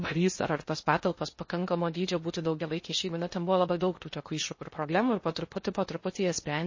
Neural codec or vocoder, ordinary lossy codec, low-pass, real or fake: codec, 16 kHz in and 24 kHz out, 0.6 kbps, FocalCodec, streaming, 2048 codes; MP3, 32 kbps; 7.2 kHz; fake